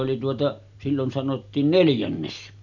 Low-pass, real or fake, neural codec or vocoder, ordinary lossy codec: 7.2 kHz; real; none; none